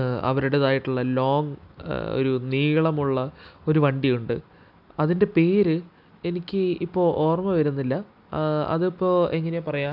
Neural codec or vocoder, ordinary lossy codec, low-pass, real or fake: none; none; 5.4 kHz; real